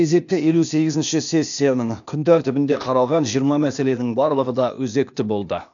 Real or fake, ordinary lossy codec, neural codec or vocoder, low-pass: fake; none; codec, 16 kHz, 0.8 kbps, ZipCodec; 7.2 kHz